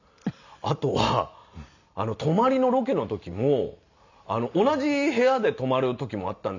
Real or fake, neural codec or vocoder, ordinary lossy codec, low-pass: real; none; none; 7.2 kHz